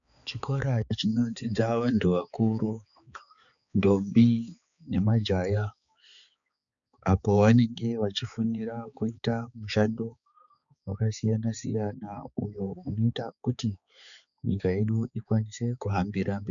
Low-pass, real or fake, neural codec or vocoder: 7.2 kHz; fake; codec, 16 kHz, 4 kbps, X-Codec, HuBERT features, trained on balanced general audio